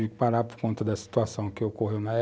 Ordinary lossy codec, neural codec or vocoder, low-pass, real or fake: none; none; none; real